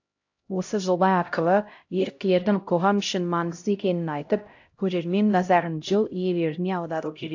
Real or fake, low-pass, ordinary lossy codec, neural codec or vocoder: fake; 7.2 kHz; AAC, 48 kbps; codec, 16 kHz, 0.5 kbps, X-Codec, HuBERT features, trained on LibriSpeech